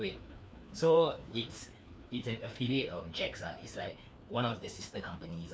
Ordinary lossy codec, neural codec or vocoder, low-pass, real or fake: none; codec, 16 kHz, 2 kbps, FreqCodec, larger model; none; fake